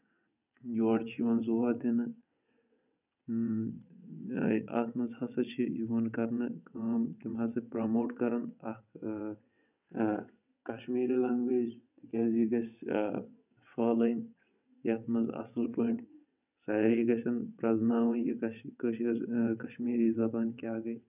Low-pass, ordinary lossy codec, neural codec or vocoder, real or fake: 3.6 kHz; none; vocoder, 22.05 kHz, 80 mel bands, WaveNeXt; fake